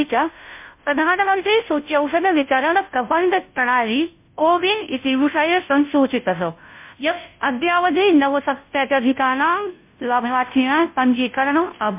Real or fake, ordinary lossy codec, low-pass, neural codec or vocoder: fake; MP3, 32 kbps; 3.6 kHz; codec, 16 kHz, 0.5 kbps, FunCodec, trained on Chinese and English, 25 frames a second